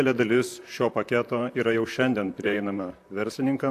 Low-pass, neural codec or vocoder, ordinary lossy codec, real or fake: 14.4 kHz; vocoder, 44.1 kHz, 128 mel bands, Pupu-Vocoder; AAC, 64 kbps; fake